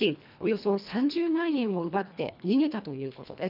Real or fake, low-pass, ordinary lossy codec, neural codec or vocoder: fake; 5.4 kHz; none; codec, 24 kHz, 1.5 kbps, HILCodec